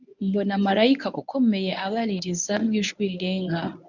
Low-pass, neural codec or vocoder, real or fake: 7.2 kHz; codec, 24 kHz, 0.9 kbps, WavTokenizer, medium speech release version 1; fake